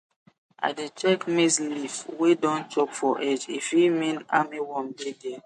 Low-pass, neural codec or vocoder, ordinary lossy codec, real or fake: 14.4 kHz; none; MP3, 48 kbps; real